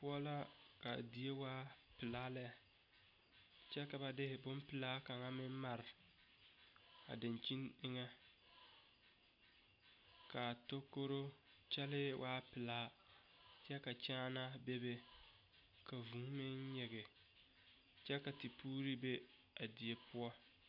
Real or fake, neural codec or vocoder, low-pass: real; none; 5.4 kHz